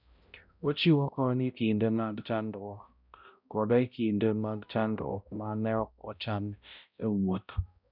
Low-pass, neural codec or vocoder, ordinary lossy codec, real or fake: 5.4 kHz; codec, 16 kHz, 0.5 kbps, X-Codec, HuBERT features, trained on balanced general audio; none; fake